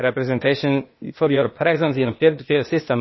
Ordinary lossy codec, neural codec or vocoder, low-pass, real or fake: MP3, 24 kbps; codec, 16 kHz, 0.8 kbps, ZipCodec; 7.2 kHz; fake